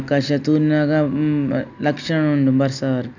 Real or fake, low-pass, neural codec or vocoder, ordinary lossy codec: real; 7.2 kHz; none; none